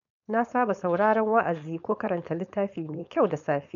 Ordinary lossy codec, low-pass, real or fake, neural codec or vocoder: none; 7.2 kHz; fake; codec, 16 kHz, 4.8 kbps, FACodec